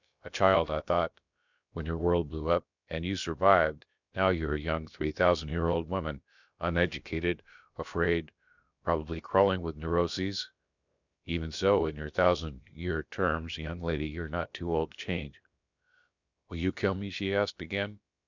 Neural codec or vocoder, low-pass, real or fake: codec, 16 kHz, about 1 kbps, DyCAST, with the encoder's durations; 7.2 kHz; fake